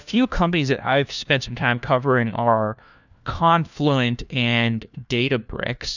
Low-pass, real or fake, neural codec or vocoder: 7.2 kHz; fake; codec, 16 kHz, 1 kbps, FunCodec, trained on LibriTTS, 50 frames a second